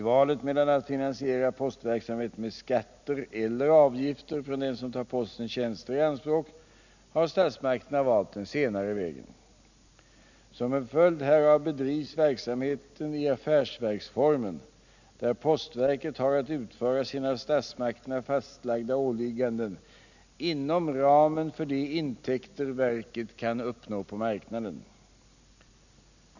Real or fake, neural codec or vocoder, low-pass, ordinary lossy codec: real; none; 7.2 kHz; none